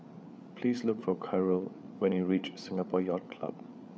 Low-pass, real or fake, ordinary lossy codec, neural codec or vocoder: none; fake; none; codec, 16 kHz, 8 kbps, FreqCodec, larger model